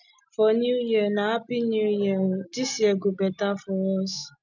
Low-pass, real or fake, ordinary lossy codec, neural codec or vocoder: 7.2 kHz; real; none; none